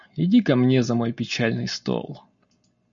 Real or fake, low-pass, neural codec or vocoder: real; 7.2 kHz; none